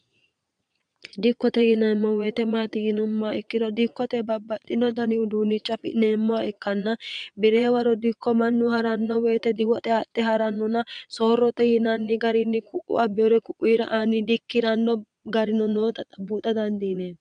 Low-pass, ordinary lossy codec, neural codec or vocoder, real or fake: 9.9 kHz; MP3, 96 kbps; vocoder, 22.05 kHz, 80 mel bands, Vocos; fake